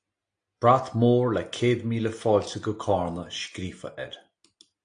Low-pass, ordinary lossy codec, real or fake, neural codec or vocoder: 9.9 kHz; AAC, 48 kbps; real; none